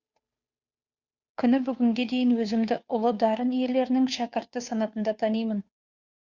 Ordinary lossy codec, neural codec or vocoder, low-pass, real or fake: Opus, 64 kbps; codec, 16 kHz, 2 kbps, FunCodec, trained on Chinese and English, 25 frames a second; 7.2 kHz; fake